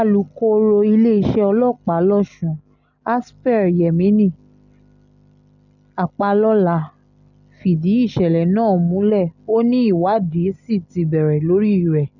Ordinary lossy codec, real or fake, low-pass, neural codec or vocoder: none; real; 7.2 kHz; none